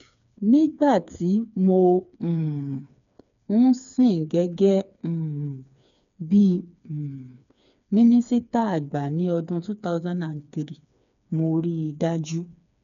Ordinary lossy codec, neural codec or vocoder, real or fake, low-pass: none; codec, 16 kHz, 4 kbps, FreqCodec, smaller model; fake; 7.2 kHz